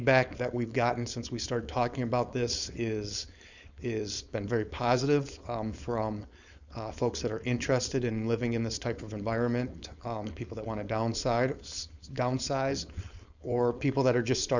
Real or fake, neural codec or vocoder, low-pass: fake; codec, 16 kHz, 4.8 kbps, FACodec; 7.2 kHz